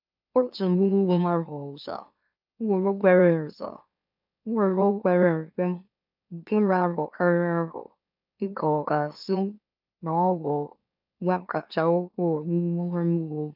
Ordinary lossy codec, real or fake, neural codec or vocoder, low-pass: none; fake; autoencoder, 44.1 kHz, a latent of 192 numbers a frame, MeloTTS; 5.4 kHz